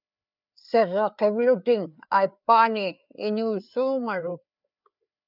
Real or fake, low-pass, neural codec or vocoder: fake; 5.4 kHz; codec, 16 kHz, 4 kbps, FreqCodec, larger model